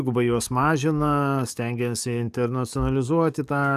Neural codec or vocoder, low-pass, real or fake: codec, 44.1 kHz, 7.8 kbps, DAC; 14.4 kHz; fake